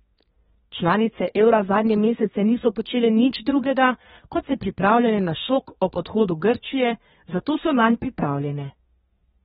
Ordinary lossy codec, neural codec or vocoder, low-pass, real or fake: AAC, 16 kbps; codec, 32 kHz, 1.9 kbps, SNAC; 14.4 kHz; fake